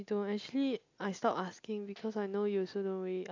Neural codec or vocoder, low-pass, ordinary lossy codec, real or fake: none; 7.2 kHz; AAC, 48 kbps; real